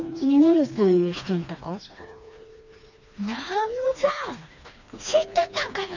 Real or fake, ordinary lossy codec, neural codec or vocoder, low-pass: fake; none; codec, 16 kHz, 2 kbps, FreqCodec, smaller model; 7.2 kHz